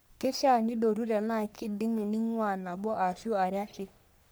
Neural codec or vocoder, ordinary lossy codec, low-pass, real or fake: codec, 44.1 kHz, 3.4 kbps, Pupu-Codec; none; none; fake